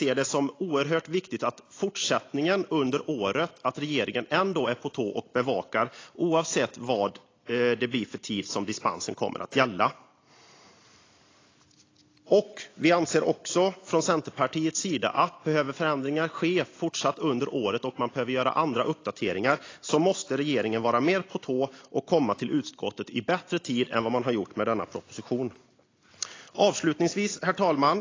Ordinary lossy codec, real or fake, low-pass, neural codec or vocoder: AAC, 32 kbps; real; 7.2 kHz; none